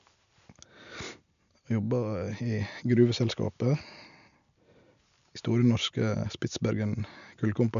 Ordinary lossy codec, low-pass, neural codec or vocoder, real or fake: none; 7.2 kHz; none; real